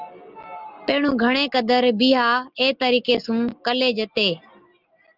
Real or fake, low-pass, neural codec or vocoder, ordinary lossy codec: real; 5.4 kHz; none; Opus, 24 kbps